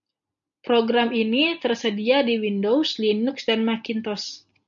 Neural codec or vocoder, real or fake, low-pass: none; real; 7.2 kHz